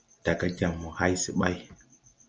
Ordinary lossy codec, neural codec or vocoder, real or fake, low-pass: Opus, 24 kbps; none; real; 7.2 kHz